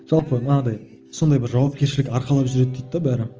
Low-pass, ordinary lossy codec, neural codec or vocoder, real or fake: 7.2 kHz; Opus, 24 kbps; none; real